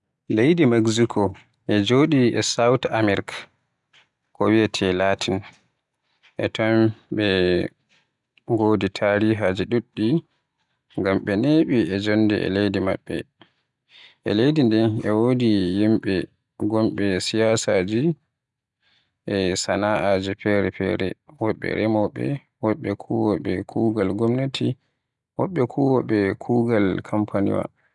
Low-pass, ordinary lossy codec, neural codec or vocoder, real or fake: 10.8 kHz; none; none; real